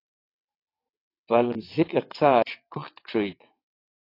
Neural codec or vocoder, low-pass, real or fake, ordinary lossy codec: vocoder, 22.05 kHz, 80 mel bands, WaveNeXt; 5.4 kHz; fake; AAC, 32 kbps